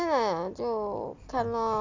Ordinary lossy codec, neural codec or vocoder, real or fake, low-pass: AAC, 48 kbps; none; real; 7.2 kHz